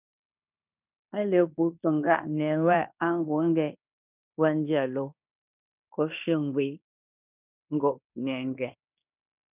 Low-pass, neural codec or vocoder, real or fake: 3.6 kHz; codec, 16 kHz in and 24 kHz out, 0.9 kbps, LongCat-Audio-Codec, fine tuned four codebook decoder; fake